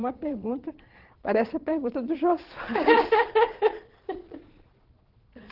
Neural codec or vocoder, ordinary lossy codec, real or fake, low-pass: vocoder, 22.05 kHz, 80 mel bands, Vocos; Opus, 16 kbps; fake; 5.4 kHz